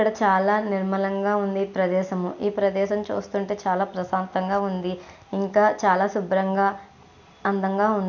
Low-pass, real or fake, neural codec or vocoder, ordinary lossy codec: 7.2 kHz; real; none; none